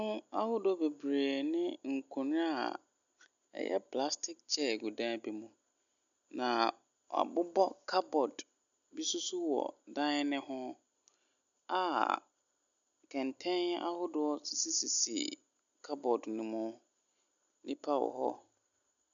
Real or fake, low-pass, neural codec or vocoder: real; 7.2 kHz; none